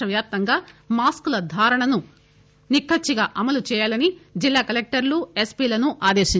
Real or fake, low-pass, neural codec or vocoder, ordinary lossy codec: real; none; none; none